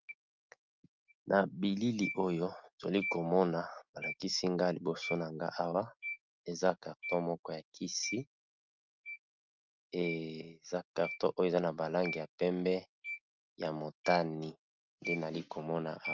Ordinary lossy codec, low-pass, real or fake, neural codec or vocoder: Opus, 32 kbps; 7.2 kHz; real; none